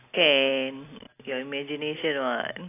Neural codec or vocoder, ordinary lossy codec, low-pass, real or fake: none; AAC, 24 kbps; 3.6 kHz; real